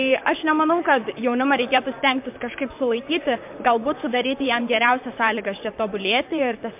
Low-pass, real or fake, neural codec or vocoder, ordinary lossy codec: 3.6 kHz; fake; vocoder, 44.1 kHz, 128 mel bands, Pupu-Vocoder; MP3, 32 kbps